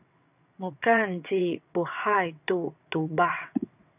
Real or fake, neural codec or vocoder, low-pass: fake; vocoder, 22.05 kHz, 80 mel bands, WaveNeXt; 3.6 kHz